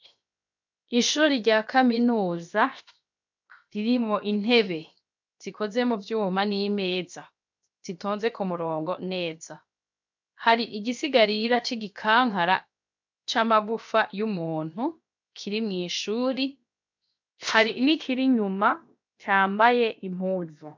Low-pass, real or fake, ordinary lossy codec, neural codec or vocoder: 7.2 kHz; fake; MP3, 64 kbps; codec, 16 kHz, 0.7 kbps, FocalCodec